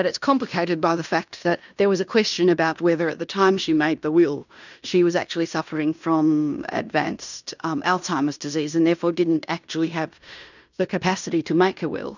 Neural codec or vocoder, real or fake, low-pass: codec, 16 kHz in and 24 kHz out, 0.9 kbps, LongCat-Audio-Codec, fine tuned four codebook decoder; fake; 7.2 kHz